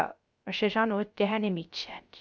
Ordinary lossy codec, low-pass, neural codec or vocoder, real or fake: none; none; codec, 16 kHz, 0.3 kbps, FocalCodec; fake